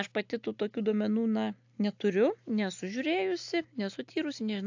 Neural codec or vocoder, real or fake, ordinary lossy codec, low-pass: none; real; AAC, 48 kbps; 7.2 kHz